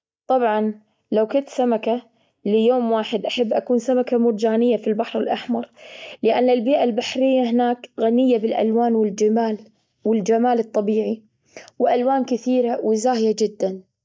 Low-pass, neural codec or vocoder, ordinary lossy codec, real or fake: none; none; none; real